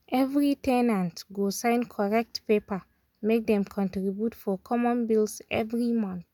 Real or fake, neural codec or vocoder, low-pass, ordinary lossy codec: real; none; 19.8 kHz; none